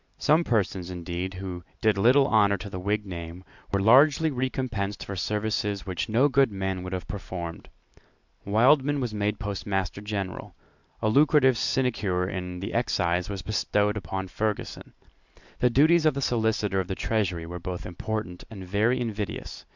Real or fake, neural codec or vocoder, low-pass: real; none; 7.2 kHz